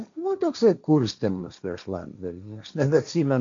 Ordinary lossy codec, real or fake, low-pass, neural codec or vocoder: MP3, 64 kbps; fake; 7.2 kHz; codec, 16 kHz, 1.1 kbps, Voila-Tokenizer